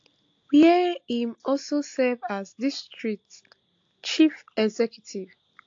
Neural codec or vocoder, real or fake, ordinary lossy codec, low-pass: none; real; AAC, 48 kbps; 7.2 kHz